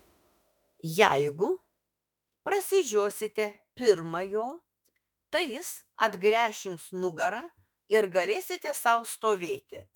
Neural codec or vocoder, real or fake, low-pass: autoencoder, 48 kHz, 32 numbers a frame, DAC-VAE, trained on Japanese speech; fake; 19.8 kHz